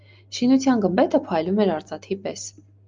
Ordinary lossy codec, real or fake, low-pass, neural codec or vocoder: Opus, 24 kbps; real; 7.2 kHz; none